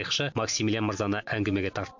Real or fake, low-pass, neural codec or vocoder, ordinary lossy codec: real; 7.2 kHz; none; MP3, 64 kbps